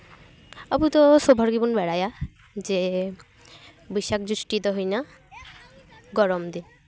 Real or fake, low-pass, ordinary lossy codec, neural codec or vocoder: real; none; none; none